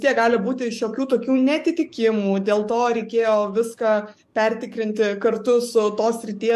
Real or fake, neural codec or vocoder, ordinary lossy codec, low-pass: fake; codec, 44.1 kHz, 7.8 kbps, DAC; MP3, 64 kbps; 14.4 kHz